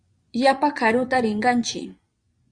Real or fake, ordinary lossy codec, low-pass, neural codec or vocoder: fake; Opus, 64 kbps; 9.9 kHz; vocoder, 24 kHz, 100 mel bands, Vocos